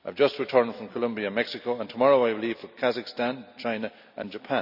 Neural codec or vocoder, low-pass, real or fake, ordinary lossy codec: none; 5.4 kHz; real; none